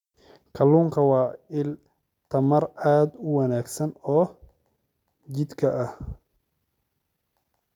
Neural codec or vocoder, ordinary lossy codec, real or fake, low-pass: none; none; real; 19.8 kHz